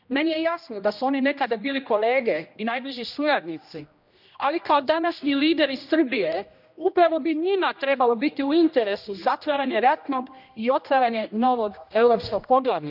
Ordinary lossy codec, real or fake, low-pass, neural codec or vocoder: none; fake; 5.4 kHz; codec, 16 kHz, 1 kbps, X-Codec, HuBERT features, trained on general audio